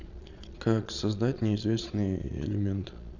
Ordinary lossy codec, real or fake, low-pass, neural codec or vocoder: none; fake; 7.2 kHz; vocoder, 22.05 kHz, 80 mel bands, Vocos